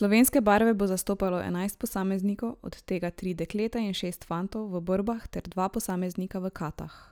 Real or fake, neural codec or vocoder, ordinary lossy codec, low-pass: real; none; none; none